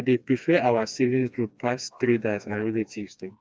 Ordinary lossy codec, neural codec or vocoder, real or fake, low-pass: none; codec, 16 kHz, 2 kbps, FreqCodec, smaller model; fake; none